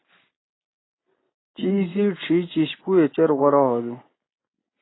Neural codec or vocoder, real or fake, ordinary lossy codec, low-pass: none; real; AAC, 16 kbps; 7.2 kHz